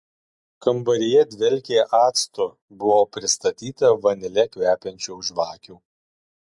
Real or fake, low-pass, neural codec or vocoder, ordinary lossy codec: real; 10.8 kHz; none; MP3, 64 kbps